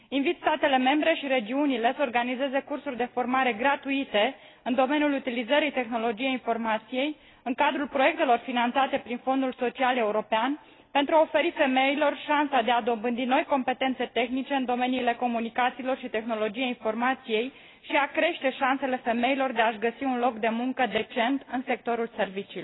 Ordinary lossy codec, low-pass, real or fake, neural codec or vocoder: AAC, 16 kbps; 7.2 kHz; real; none